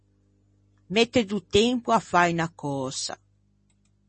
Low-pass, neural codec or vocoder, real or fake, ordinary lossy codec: 10.8 kHz; none; real; MP3, 32 kbps